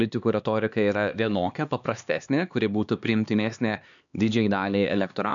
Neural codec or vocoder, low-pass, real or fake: codec, 16 kHz, 2 kbps, X-Codec, HuBERT features, trained on LibriSpeech; 7.2 kHz; fake